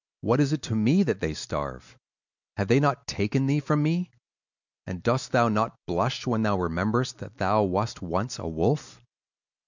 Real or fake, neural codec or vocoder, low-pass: real; none; 7.2 kHz